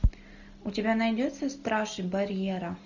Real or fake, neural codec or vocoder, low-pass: real; none; 7.2 kHz